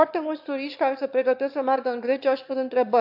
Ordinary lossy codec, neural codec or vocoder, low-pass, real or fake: AAC, 48 kbps; autoencoder, 22.05 kHz, a latent of 192 numbers a frame, VITS, trained on one speaker; 5.4 kHz; fake